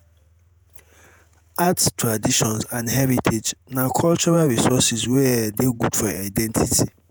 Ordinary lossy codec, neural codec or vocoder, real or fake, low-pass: none; vocoder, 48 kHz, 128 mel bands, Vocos; fake; none